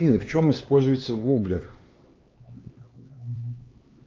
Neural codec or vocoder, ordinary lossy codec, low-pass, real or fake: codec, 16 kHz, 2 kbps, X-Codec, HuBERT features, trained on LibriSpeech; Opus, 24 kbps; 7.2 kHz; fake